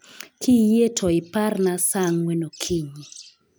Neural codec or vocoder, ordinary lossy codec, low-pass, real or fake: none; none; none; real